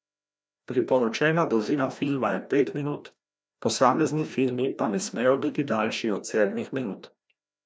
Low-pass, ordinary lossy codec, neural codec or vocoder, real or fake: none; none; codec, 16 kHz, 1 kbps, FreqCodec, larger model; fake